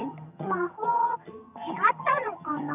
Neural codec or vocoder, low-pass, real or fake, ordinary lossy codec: vocoder, 22.05 kHz, 80 mel bands, HiFi-GAN; 3.6 kHz; fake; none